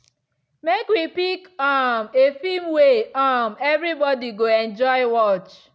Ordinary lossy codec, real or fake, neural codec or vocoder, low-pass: none; real; none; none